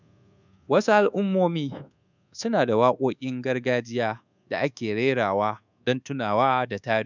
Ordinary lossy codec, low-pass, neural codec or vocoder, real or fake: none; 7.2 kHz; codec, 24 kHz, 1.2 kbps, DualCodec; fake